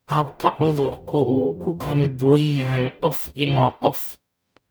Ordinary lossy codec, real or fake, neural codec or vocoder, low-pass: none; fake; codec, 44.1 kHz, 0.9 kbps, DAC; none